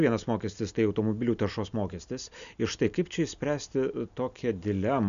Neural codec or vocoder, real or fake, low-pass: none; real; 7.2 kHz